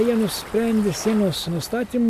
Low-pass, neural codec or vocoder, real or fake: 14.4 kHz; none; real